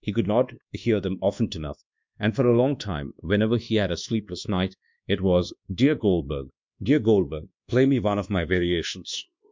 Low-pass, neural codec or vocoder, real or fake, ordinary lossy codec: 7.2 kHz; codec, 24 kHz, 1.2 kbps, DualCodec; fake; MP3, 64 kbps